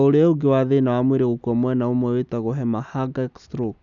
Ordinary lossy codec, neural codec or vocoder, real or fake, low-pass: none; none; real; 7.2 kHz